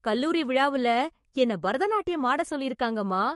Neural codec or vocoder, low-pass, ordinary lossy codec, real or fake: codec, 44.1 kHz, 7.8 kbps, DAC; 14.4 kHz; MP3, 48 kbps; fake